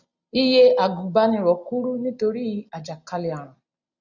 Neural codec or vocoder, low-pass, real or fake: none; 7.2 kHz; real